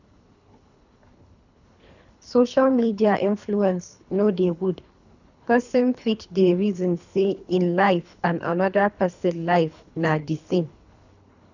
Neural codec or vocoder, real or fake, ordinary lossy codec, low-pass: codec, 24 kHz, 3 kbps, HILCodec; fake; none; 7.2 kHz